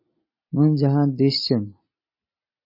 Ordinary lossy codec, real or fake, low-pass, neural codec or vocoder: MP3, 24 kbps; real; 5.4 kHz; none